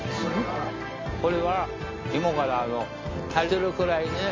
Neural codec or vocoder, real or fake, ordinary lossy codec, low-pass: none; real; MP3, 48 kbps; 7.2 kHz